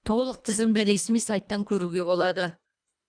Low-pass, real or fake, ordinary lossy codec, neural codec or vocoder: 9.9 kHz; fake; none; codec, 24 kHz, 1.5 kbps, HILCodec